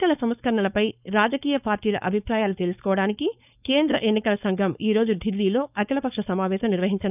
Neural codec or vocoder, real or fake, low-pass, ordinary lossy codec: codec, 16 kHz, 4.8 kbps, FACodec; fake; 3.6 kHz; none